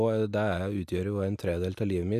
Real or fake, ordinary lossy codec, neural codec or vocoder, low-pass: real; AAC, 96 kbps; none; 14.4 kHz